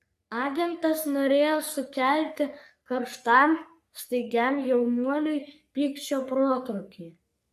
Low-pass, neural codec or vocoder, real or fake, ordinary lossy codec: 14.4 kHz; codec, 44.1 kHz, 3.4 kbps, Pupu-Codec; fake; AAC, 96 kbps